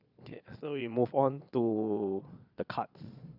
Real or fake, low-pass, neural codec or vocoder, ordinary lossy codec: fake; 5.4 kHz; vocoder, 22.05 kHz, 80 mel bands, Vocos; none